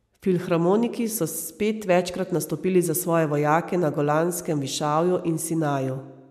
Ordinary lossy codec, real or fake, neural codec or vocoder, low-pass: MP3, 96 kbps; real; none; 14.4 kHz